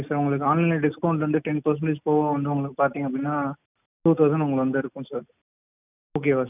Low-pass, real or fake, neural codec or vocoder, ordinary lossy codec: 3.6 kHz; real; none; none